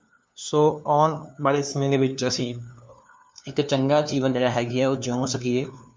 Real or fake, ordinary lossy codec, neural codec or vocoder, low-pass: fake; none; codec, 16 kHz, 2 kbps, FunCodec, trained on LibriTTS, 25 frames a second; none